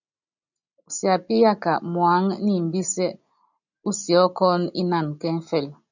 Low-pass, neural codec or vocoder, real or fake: 7.2 kHz; none; real